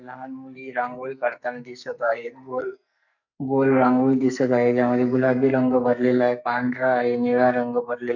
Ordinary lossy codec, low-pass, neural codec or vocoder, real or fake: none; 7.2 kHz; codec, 44.1 kHz, 2.6 kbps, SNAC; fake